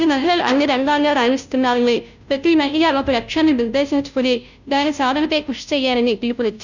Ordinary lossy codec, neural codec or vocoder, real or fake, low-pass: none; codec, 16 kHz, 0.5 kbps, FunCodec, trained on Chinese and English, 25 frames a second; fake; 7.2 kHz